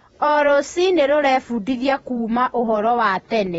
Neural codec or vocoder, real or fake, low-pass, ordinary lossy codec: vocoder, 48 kHz, 128 mel bands, Vocos; fake; 19.8 kHz; AAC, 24 kbps